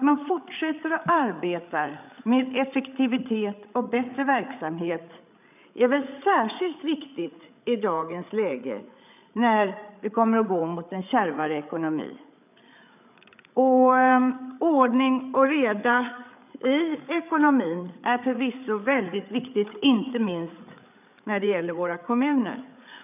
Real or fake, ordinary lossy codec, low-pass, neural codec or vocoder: fake; none; 3.6 kHz; codec, 16 kHz, 8 kbps, FreqCodec, larger model